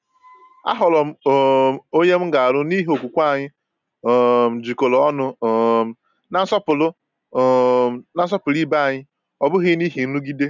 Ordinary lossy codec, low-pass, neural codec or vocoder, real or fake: none; 7.2 kHz; none; real